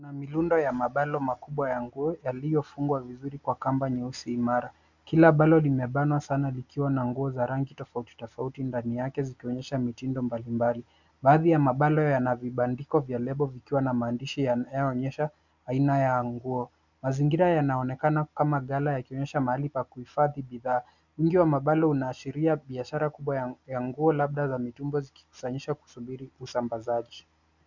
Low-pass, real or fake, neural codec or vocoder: 7.2 kHz; real; none